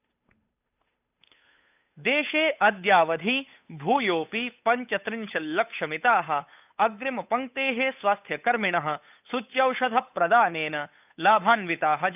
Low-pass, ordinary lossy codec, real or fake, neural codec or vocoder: 3.6 kHz; none; fake; codec, 16 kHz, 8 kbps, FunCodec, trained on Chinese and English, 25 frames a second